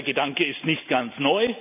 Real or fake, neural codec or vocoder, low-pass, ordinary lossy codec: real; none; 3.6 kHz; none